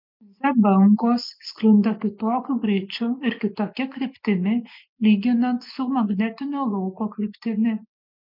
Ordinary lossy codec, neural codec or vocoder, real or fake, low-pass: MP3, 48 kbps; none; real; 5.4 kHz